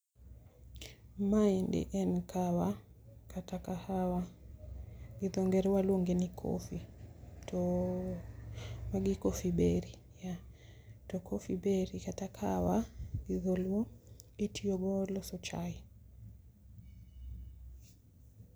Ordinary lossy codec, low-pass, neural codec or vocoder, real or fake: none; none; none; real